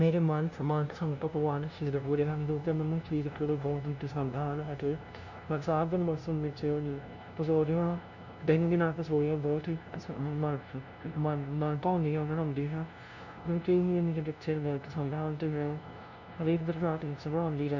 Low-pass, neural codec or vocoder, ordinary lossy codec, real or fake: 7.2 kHz; codec, 16 kHz, 0.5 kbps, FunCodec, trained on LibriTTS, 25 frames a second; none; fake